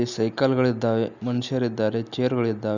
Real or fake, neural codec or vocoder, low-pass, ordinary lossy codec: real; none; 7.2 kHz; none